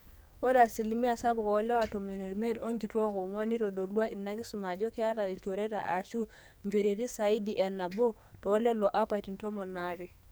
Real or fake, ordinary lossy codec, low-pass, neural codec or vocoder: fake; none; none; codec, 44.1 kHz, 2.6 kbps, SNAC